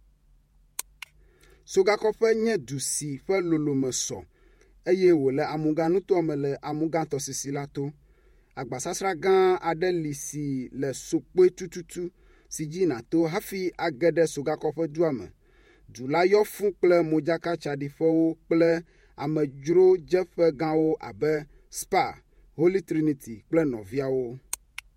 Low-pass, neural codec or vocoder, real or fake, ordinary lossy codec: 19.8 kHz; vocoder, 48 kHz, 128 mel bands, Vocos; fake; MP3, 64 kbps